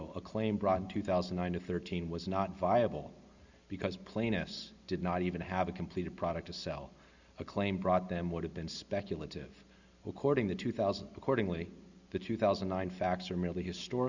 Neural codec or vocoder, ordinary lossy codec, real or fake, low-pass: none; Opus, 64 kbps; real; 7.2 kHz